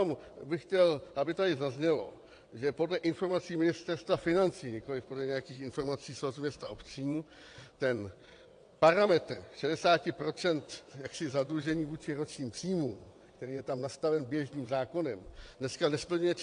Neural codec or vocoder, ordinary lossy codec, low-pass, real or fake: vocoder, 22.05 kHz, 80 mel bands, WaveNeXt; AAC, 48 kbps; 9.9 kHz; fake